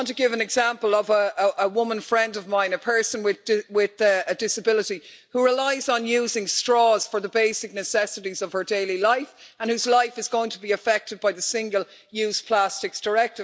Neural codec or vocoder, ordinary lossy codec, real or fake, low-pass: none; none; real; none